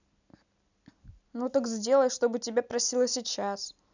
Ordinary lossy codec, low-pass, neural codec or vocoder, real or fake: none; 7.2 kHz; none; real